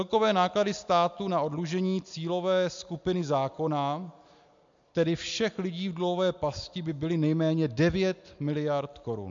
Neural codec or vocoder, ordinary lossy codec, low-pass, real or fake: none; MP3, 64 kbps; 7.2 kHz; real